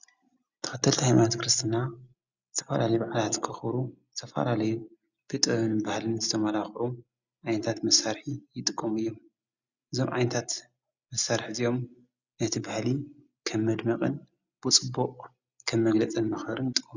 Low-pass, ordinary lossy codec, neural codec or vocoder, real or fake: 7.2 kHz; Opus, 64 kbps; none; real